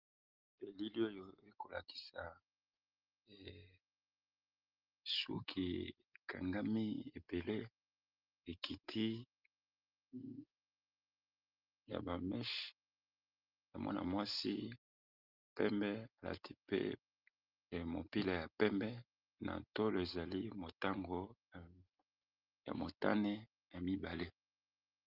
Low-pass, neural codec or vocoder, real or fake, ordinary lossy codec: 5.4 kHz; none; real; Opus, 32 kbps